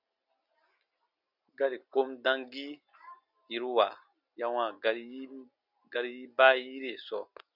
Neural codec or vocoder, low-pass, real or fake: none; 5.4 kHz; real